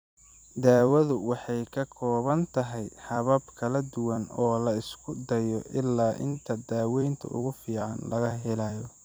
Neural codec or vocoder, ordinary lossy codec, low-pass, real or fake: vocoder, 44.1 kHz, 128 mel bands every 256 samples, BigVGAN v2; none; none; fake